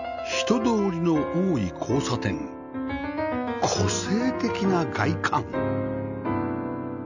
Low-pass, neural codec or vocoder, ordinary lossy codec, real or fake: 7.2 kHz; none; none; real